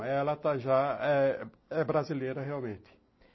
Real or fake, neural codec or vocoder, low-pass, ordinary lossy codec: real; none; 7.2 kHz; MP3, 24 kbps